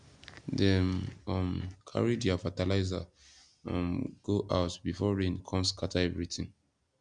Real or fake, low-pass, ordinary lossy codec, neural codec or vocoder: real; 9.9 kHz; none; none